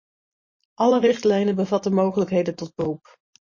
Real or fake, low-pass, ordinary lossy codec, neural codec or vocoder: fake; 7.2 kHz; MP3, 32 kbps; vocoder, 44.1 kHz, 128 mel bands every 512 samples, BigVGAN v2